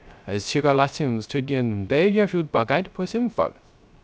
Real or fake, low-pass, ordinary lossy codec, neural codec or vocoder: fake; none; none; codec, 16 kHz, 0.3 kbps, FocalCodec